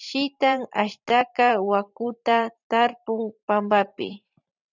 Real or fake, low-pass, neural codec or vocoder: fake; 7.2 kHz; vocoder, 44.1 kHz, 128 mel bands every 512 samples, BigVGAN v2